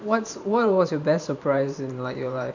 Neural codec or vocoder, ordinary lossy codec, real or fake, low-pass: vocoder, 44.1 kHz, 128 mel bands every 512 samples, BigVGAN v2; none; fake; 7.2 kHz